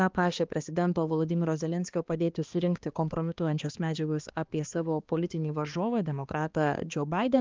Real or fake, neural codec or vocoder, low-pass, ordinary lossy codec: fake; codec, 16 kHz, 2 kbps, X-Codec, HuBERT features, trained on balanced general audio; 7.2 kHz; Opus, 32 kbps